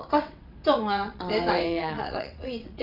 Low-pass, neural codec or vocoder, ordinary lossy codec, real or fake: 5.4 kHz; vocoder, 22.05 kHz, 80 mel bands, WaveNeXt; none; fake